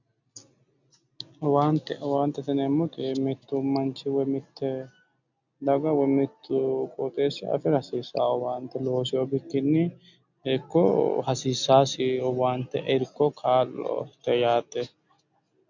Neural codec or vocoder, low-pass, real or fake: none; 7.2 kHz; real